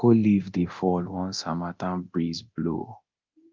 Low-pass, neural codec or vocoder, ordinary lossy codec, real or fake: 7.2 kHz; codec, 24 kHz, 0.9 kbps, DualCodec; Opus, 24 kbps; fake